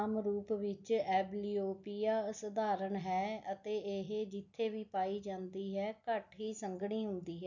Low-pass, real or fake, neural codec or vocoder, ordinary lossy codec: 7.2 kHz; real; none; none